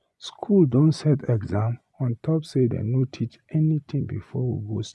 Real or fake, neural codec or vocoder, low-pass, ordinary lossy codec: fake; vocoder, 24 kHz, 100 mel bands, Vocos; none; none